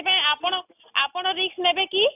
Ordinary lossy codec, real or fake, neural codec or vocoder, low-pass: none; real; none; 3.6 kHz